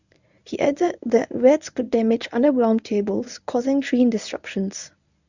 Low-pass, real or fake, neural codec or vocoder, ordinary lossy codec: 7.2 kHz; fake; codec, 24 kHz, 0.9 kbps, WavTokenizer, medium speech release version 1; none